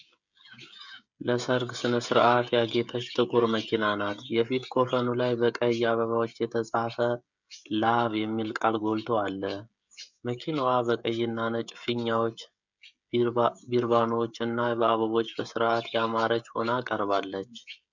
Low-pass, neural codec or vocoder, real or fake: 7.2 kHz; codec, 16 kHz, 16 kbps, FreqCodec, smaller model; fake